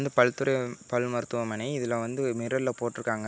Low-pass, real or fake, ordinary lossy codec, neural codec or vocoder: none; real; none; none